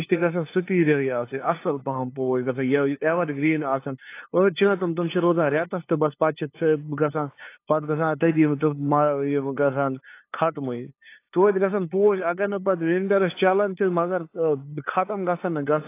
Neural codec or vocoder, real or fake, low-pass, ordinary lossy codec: codec, 16 kHz, 2 kbps, FunCodec, trained on LibriTTS, 25 frames a second; fake; 3.6 kHz; AAC, 24 kbps